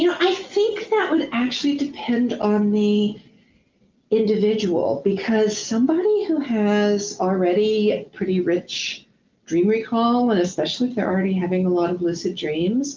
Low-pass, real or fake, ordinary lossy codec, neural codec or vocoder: 7.2 kHz; real; Opus, 32 kbps; none